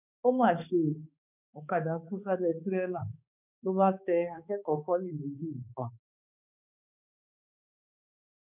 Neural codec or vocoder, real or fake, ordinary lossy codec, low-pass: codec, 16 kHz, 2 kbps, X-Codec, HuBERT features, trained on balanced general audio; fake; none; 3.6 kHz